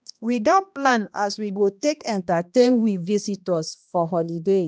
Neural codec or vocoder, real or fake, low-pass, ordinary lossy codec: codec, 16 kHz, 1 kbps, X-Codec, HuBERT features, trained on balanced general audio; fake; none; none